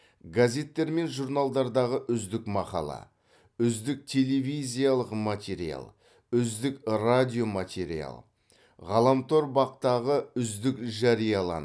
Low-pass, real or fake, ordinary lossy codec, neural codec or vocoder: none; real; none; none